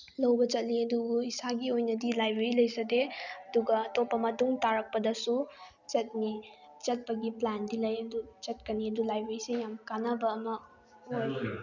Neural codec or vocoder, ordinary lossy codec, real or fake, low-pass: none; none; real; 7.2 kHz